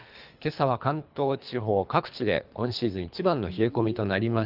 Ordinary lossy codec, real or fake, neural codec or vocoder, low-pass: Opus, 24 kbps; fake; codec, 24 kHz, 3 kbps, HILCodec; 5.4 kHz